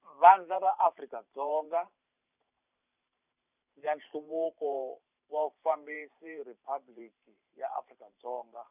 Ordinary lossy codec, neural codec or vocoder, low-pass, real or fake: none; vocoder, 44.1 kHz, 128 mel bands every 512 samples, BigVGAN v2; 3.6 kHz; fake